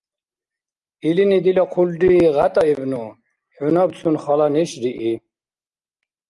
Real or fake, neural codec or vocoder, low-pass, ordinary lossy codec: real; none; 10.8 kHz; Opus, 32 kbps